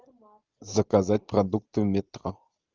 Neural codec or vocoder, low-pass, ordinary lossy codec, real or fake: none; 7.2 kHz; Opus, 24 kbps; real